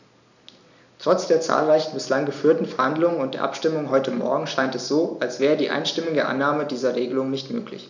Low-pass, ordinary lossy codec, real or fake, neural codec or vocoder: 7.2 kHz; none; real; none